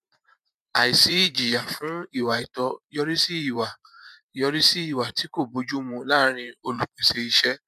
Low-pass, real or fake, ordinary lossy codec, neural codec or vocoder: 14.4 kHz; fake; none; vocoder, 44.1 kHz, 128 mel bands every 512 samples, BigVGAN v2